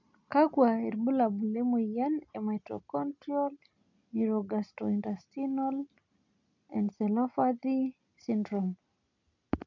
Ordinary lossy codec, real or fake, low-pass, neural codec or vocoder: none; real; 7.2 kHz; none